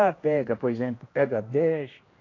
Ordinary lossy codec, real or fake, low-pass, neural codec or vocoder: AAC, 32 kbps; fake; 7.2 kHz; codec, 16 kHz, 1 kbps, X-Codec, HuBERT features, trained on general audio